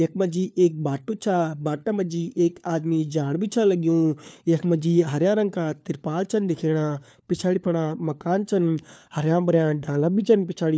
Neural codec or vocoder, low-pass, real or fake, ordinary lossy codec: codec, 16 kHz, 4 kbps, FunCodec, trained on LibriTTS, 50 frames a second; none; fake; none